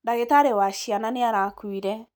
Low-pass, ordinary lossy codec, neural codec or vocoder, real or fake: none; none; none; real